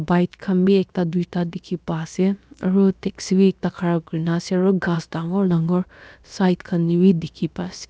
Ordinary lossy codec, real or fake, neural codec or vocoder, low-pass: none; fake; codec, 16 kHz, about 1 kbps, DyCAST, with the encoder's durations; none